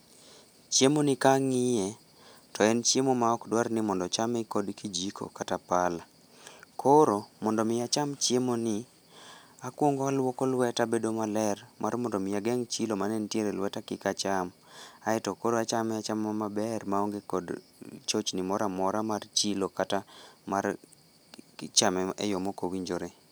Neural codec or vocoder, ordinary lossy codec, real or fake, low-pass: none; none; real; none